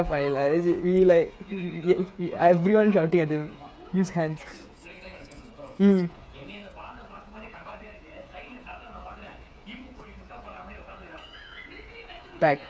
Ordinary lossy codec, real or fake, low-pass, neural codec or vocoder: none; fake; none; codec, 16 kHz, 8 kbps, FreqCodec, smaller model